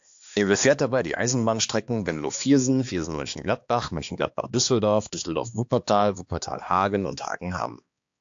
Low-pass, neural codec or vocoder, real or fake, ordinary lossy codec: 7.2 kHz; codec, 16 kHz, 2 kbps, X-Codec, HuBERT features, trained on balanced general audio; fake; AAC, 64 kbps